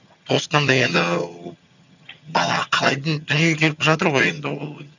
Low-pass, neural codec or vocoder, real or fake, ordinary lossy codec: 7.2 kHz; vocoder, 22.05 kHz, 80 mel bands, HiFi-GAN; fake; none